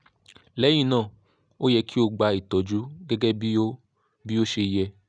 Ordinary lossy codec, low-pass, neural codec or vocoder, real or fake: none; 9.9 kHz; none; real